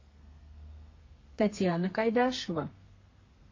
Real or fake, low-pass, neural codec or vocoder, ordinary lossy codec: fake; 7.2 kHz; codec, 32 kHz, 1.9 kbps, SNAC; MP3, 32 kbps